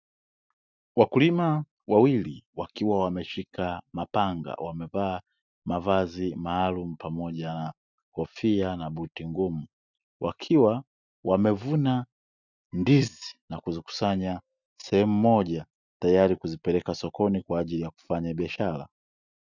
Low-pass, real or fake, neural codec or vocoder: 7.2 kHz; real; none